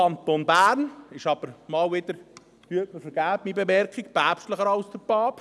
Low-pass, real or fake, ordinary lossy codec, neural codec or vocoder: none; real; none; none